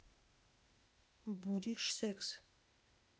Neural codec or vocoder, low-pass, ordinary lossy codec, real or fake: codec, 16 kHz, 0.8 kbps, ZipCodec; none; none; fake